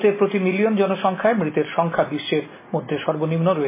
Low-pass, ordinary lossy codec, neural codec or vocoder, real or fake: 3.6 kHz; MP3, 16 kbps; none; real